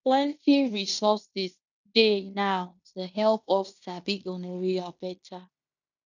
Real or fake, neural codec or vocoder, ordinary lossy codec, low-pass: fake; codec, 16 kHz in and 24 kHz out, 0.9 kbps, LongCat-Audio-Codec, fine tuned four codebook decoder; none; 7.2 kHz